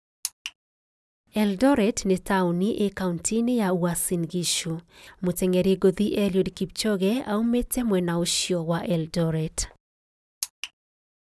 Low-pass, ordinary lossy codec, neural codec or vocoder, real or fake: none; none; none; real